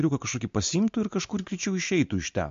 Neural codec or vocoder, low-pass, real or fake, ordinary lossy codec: none; 7.2 kHz; real; MP3, 48 kbps